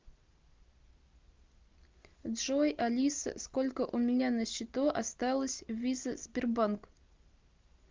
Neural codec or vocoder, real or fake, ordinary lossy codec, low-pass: none; real; Opus, 24 kbps; 7.2 kHz